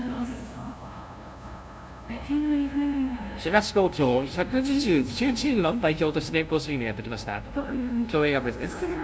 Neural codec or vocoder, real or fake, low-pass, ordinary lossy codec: codec, 16 kHz, 0.5 kbps, FunCodec, trained on LibriTTS, 25 frames a second; fake; none; none